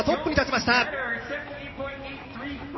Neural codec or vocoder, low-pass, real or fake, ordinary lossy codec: none; 7.2 kHz; real; MP3, 24 kbps